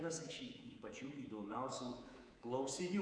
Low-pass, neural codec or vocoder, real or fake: 9.9 kHz; codec, 24 kHz, 3.1 kbps, DualCodec; fake